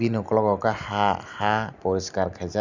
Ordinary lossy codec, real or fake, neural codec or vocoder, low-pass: none; real; none; 7.2 kHz